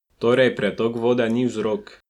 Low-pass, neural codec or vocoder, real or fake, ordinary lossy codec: 19.8 kHz; none; real; none